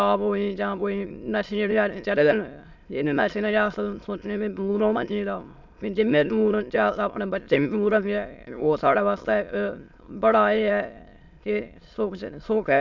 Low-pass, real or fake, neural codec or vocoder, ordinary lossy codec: 7.2 kHz; fake; autoencoder, 22.05 kHz, a latent of 192 numbers a frame, VITS, trained on many speakers; MP3, 64 kbps